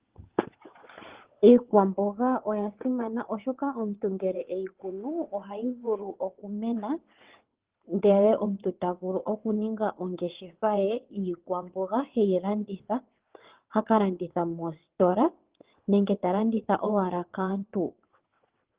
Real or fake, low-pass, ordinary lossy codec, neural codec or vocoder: fake; 3.6 kHz; Opus, 16 kbps; vocoder, 22.05 kHz, 80 mel bands, WaveNeXt